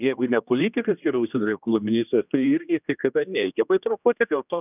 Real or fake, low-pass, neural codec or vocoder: fake; 3.6 kHz; codec, 16 kHz, 1 kbps, X-Codec, HuBERT features, trained on general audio